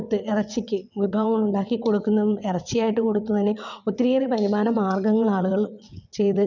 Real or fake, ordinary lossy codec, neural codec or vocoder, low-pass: fake; none; vocoder, 22.05 kHz, 80 mel bands, WaveNeXt; 7.2 kHz